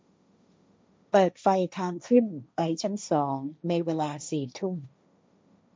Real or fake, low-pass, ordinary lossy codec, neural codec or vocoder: fake; none; none; codec, 16 kHz, 1.1 kbps, Voila-Tokenizer